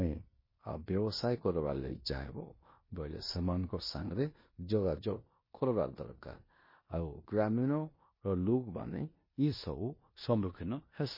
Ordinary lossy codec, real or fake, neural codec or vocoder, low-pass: MP3, 24 kbps; fake; codec, 16 kHz in and 24 kHz out, 0.9 kbps, LongCat-Audio-Codec, four codebook decoder; 5.4 kHz